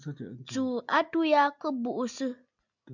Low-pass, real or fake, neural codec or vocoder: 7.2 kHz; real; none